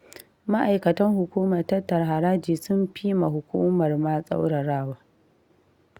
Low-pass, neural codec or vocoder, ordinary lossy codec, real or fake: 19.8 kHz; none; Opus, 64 kbps; real